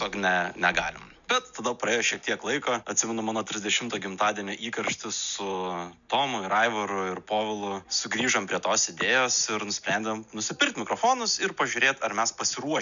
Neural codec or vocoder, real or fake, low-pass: none; real; 7.2 kHz